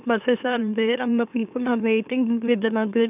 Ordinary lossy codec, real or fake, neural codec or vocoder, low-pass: none; fake; autoencoder, 44.1 kHz, a latent of 192 numbers a frame, MeloTTS; 3.6 kHz